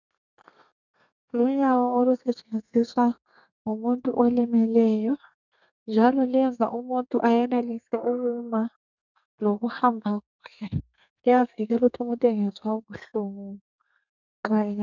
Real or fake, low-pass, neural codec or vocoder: fake; 7.2 kHz; codec, 32 kHz, 1.9 kbps, SNAC